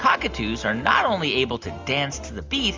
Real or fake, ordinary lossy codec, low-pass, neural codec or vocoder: real; Opus, 32 kbps; 7.2 kHz; none